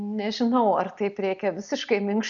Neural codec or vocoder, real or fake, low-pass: none; real; 7.2 kHz